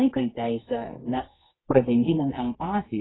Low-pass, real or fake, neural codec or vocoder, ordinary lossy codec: 7.2 kHz; fake; codec, 24 kHz, 0.9 kbps, WavTokenizer, medium music audio release; AAC, 16 kbps